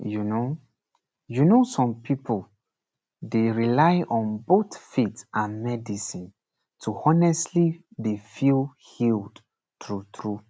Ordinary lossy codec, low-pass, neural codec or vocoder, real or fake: none; none; none; real